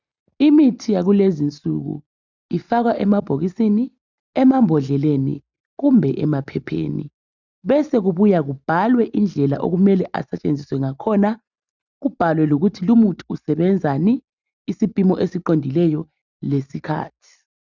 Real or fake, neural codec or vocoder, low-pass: real; none; 7.2 kHz